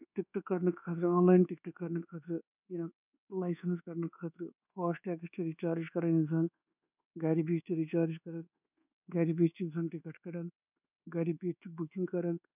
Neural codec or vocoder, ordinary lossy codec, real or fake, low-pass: codec, 24 kHz, 1.2 kbps, DualCodec; none; fake; 3.6 kHz